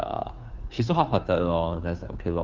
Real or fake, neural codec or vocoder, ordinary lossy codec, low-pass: fake; codec, 16 kHz, 2 kbps, FunCodec, trained on Chinese and English, 25 frames a second; Opus, 32 kbps; 7.2 kHz